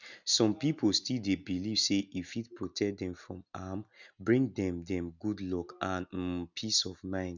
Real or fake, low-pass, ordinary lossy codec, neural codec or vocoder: real; 7.2 kHz; none; none